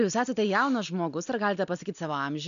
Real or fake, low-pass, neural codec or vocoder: real; 7.2 kHz; none